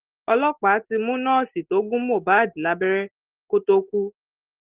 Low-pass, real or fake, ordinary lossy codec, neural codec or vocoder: 3.6 kHz; real; Opus, 16 kbps; none